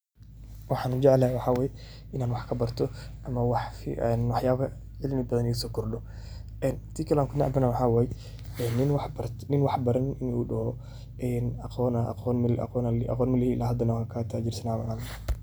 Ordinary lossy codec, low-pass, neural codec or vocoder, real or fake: none; none; none; real